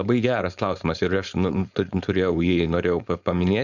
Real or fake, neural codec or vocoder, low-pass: fake; codec, 16 kHz, 4.8 kbps, FACodec; 7.2 kHz